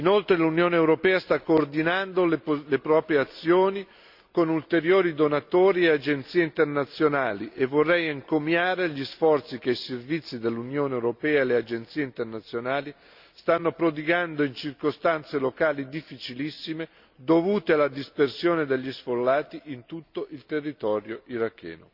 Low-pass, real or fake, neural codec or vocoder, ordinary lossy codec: 5.4 kHz; real; none; Opus, 64 kbps